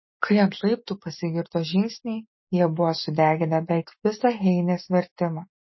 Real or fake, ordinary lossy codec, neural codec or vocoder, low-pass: real; MP3, 24 kbps; none; 7.2 kHz